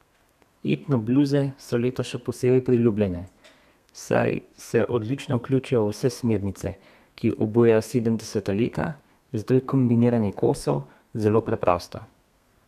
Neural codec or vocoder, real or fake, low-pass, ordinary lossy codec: codec, 32 kHz, 1.9 kbps, SNAC; fake; 14.4 kHz; none